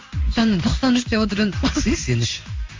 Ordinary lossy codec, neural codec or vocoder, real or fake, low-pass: MP3, 48 kbps; codec, 16 kHz in and 24 kHz out, 1 kbps, XY-Tokenizer; fake; 7.2 kHz